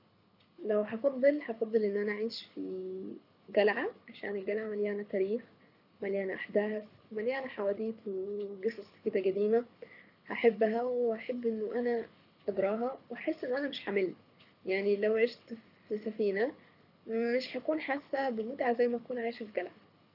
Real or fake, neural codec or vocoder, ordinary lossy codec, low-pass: fake; codec, 24 kHz, 6 kbps, HILCodec; none; 5.4 kHz